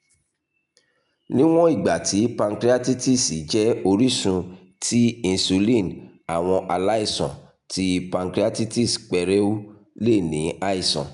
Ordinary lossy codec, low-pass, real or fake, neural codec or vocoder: none; 10.8 kHz; real; none